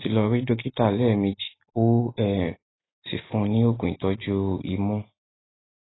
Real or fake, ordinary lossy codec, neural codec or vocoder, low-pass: real; AAC, 16 kbps; none; 7.2 kHz